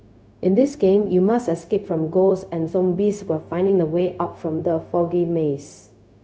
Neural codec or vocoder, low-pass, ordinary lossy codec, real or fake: codec, 16 kHz, 0.4 kbps, LongCat-Audio-Codec; none; none; fake